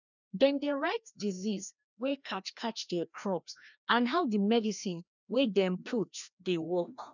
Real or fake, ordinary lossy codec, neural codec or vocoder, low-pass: fake; none; codec, 16 kHz, 1 kbps, FreqCodec, larger model; 7.2 kHz